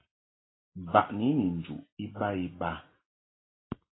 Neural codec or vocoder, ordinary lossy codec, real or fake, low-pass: none; AAC, 16 kbps; real; 7.2 kHz